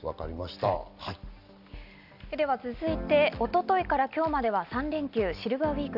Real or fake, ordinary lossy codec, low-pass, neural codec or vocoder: real; none; 5.4 kHz; none